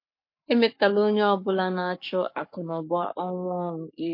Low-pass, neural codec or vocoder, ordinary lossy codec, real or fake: 5.4 kHz; codec, 44.1 kHz, 7.8 kbps, Pupu-Codec; MP3, 32 kbps; fake